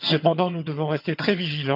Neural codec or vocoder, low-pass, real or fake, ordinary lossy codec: vocoder, 22.05 kHz, 80 mel bands, HiFi-GAN; 5.4 kHz; fake; none